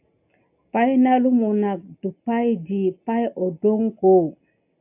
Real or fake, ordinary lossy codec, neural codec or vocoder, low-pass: real; AAC, 32 kbps; none; 3.6 kHz